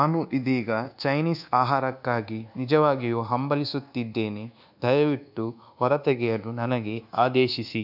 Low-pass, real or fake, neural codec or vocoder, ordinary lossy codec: 5.4 kHz; fake; codec, 24 kHz, 1.2 kbps, DualCodec; none